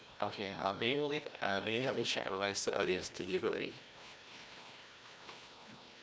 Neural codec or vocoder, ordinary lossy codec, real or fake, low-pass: codec, 16 kHz, 1 kbps, FreqCodec, larger model; none; fake; none